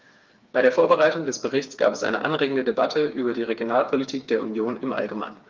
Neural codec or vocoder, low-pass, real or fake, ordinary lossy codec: codec, 16 kHz, 4 kbps, FreqCodec, smaller model; 7.2 kHz; fake; Opus, 24 kbps